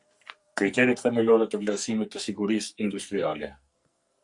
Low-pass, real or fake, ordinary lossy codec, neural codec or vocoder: 10.8 kHz; fake; Opus, 64 kbps; codec, 44.1 kHz, 2.6 kbps, SNAC